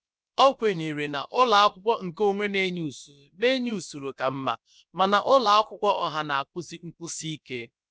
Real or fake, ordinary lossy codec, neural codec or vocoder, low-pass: fake; none; codec, 16 kHz, about 1 kbps, DyCAST, with the encoder's durations; none